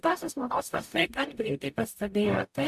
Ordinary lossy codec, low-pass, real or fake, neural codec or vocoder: AAC, 96 kbps; 14.4 kHz; fake; codec, 44.1 kHz, 0.9 kbps, DAC